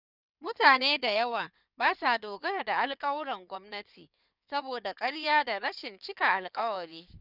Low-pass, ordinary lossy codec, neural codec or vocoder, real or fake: 5.4 kHz; none; codec, 16 kHz in and 24 kHz out, 2.2 kbps, FireRedTTS-2 codec; fake